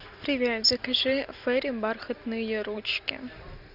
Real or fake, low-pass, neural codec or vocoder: real; 5.4 kHz; none